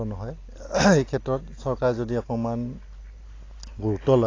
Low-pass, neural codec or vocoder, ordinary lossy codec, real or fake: 7.2 kHz; none; AAC, 32 kbps; real